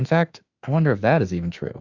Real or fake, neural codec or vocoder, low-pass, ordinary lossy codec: fake; autoencoder, 48 kHz, 32 numbers a frame, DAC-VAE, trained on Japanese speech; 7.2 kHz; Opus, 64 kbps